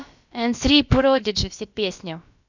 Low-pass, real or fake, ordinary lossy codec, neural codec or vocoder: 7.2 kHz; fake; none; codec, 16 kHz, about 1 kbps, DyCAST, with the encoder's durations